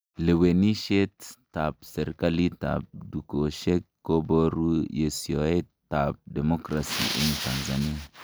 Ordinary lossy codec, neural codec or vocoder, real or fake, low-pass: none; none; real; none